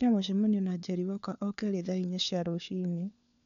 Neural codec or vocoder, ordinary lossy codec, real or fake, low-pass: codec, 16 kHz, 4 kbps, FunCodec, trained on LibriTTS, 50 frames a second; none; fake; 7.2 kHz